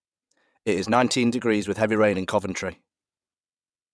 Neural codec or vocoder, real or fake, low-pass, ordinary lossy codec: vocoder, 22.05 kHz, 80 mel bands, WaveNeXt; fake; none; none